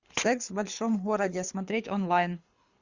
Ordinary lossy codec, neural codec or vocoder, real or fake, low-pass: Opus, 64 kbps; codec, 24 kHz, 6 kbps, HILCodec; fake; 7.2 kHz